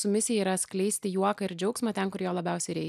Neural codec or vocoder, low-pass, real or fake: none; 14.4 kHz; real